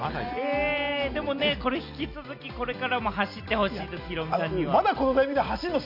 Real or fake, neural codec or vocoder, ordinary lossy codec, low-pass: real; none; none; 5.4 kHz